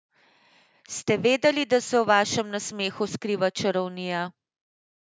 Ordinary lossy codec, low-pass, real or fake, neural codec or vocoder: none; none; real; none